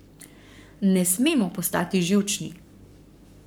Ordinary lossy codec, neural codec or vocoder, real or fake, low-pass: none; codec, 44.1 kHz, 7.8 kbps, Pupu-Codec; fake; none